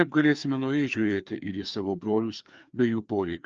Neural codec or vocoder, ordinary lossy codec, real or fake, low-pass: codec, 16 kHz, 2 kbps, FreqCodec, larger model; Opus, 24 kbps; fake; 7.2 kHz